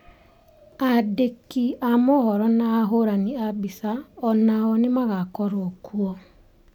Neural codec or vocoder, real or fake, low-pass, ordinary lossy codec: none; real; 19.8 kHz; none